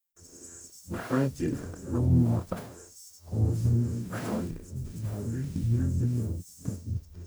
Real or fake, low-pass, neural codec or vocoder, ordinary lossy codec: fake; none; codec, 44.1 kHz, 0.9 kbps, DAC; none